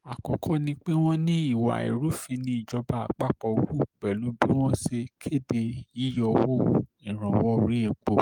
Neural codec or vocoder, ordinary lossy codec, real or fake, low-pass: codec, 44.1 kHz, 7.8 kbps, DAC; Opus, 32 kbps; fake; 19.8 kHz